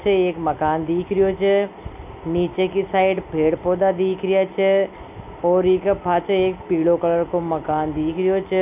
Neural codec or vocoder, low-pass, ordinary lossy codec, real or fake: none; 3.6 kHz; none; real